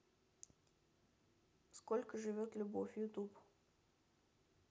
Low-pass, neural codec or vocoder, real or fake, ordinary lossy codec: none; none; real; none